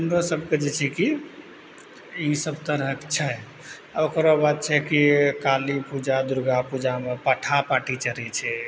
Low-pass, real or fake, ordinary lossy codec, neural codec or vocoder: none; real; none; none